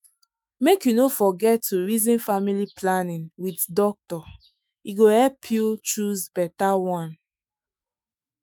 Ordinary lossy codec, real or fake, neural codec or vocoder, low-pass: none; fake; autoencoder, 48 kHz, 128 numbers a frame, DAC-VAE, trained on Japanese speech; none